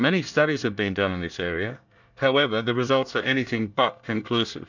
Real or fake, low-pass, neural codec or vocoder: fake; 7.2 kHz; codec, 24 kHz, 1 kbps, SNAC